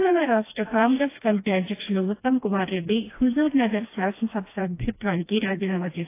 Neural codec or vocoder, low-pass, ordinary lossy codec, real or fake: codec, 16 kHz, 1 kbps, FreqCodec, smaller model; 3.6 kHz; AAC, 24 kbps; fake